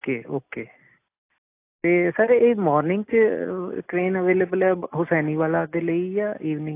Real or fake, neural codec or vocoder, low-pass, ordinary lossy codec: real; none; 3.6 kHz; AAC, 24 kbps